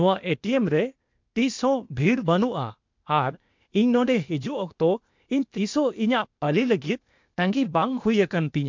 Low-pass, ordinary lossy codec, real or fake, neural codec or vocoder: 7.2 kHz; MP3, 64 kbps; fake; codec, 16 kHz, 0.8 kbps, ZipCodec